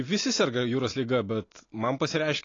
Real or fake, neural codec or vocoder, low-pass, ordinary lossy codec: real; none; 7.2 kHz; AAC, 32 kbps